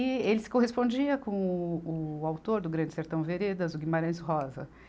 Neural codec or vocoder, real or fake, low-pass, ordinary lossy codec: none; real; none; none